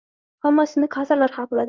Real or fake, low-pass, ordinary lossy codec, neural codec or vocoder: fake; 7.2 kHz; Opus, 24 kbps; codec, 16 kHz in and 24 kHz out, 1 kbps, XY-Tokenizer